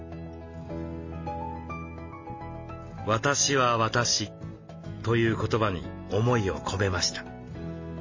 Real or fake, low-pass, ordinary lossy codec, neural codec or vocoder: real; 7.2 kHz; MP3, 32 kbps; none